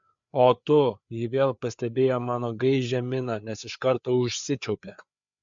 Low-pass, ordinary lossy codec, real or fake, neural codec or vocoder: 7.2 kHz; MP3, 64 kbps; fake; codec, 16 kHz, 4 kbps, FreqCodec, larger model